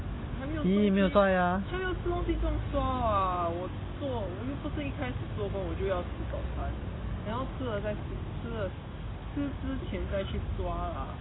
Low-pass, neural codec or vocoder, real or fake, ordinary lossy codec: 7.2 kHz; none; real; AAC, 16 kbps